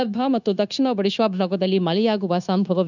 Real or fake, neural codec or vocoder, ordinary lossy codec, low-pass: fake; codec, 16 kHz, 0.9 kbps, LongCat-Audio-Codec; none; 7.2 kHz